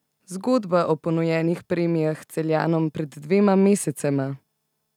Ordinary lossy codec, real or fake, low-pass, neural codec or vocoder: none; real; 19.8 kHz; none